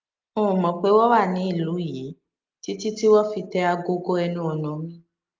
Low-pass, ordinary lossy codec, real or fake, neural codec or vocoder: 7.2 kHz; Opus, 32 kbps; real; none